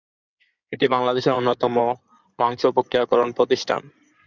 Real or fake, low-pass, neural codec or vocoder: fake; 7.2 kHz; codec, 16 kHz in and 24 kHz out, 2.2 kbps, FireRedTTS-2 codec